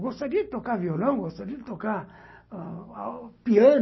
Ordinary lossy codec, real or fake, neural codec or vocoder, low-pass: MP3, 24 kbps; real; none; 7.2 kHz